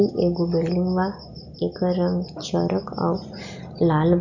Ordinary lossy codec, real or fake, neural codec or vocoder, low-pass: none; real; none; 7.2 kHz